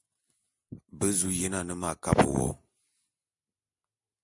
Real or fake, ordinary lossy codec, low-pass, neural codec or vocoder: real; AAC, 64 kbps; 10.8 kHz; none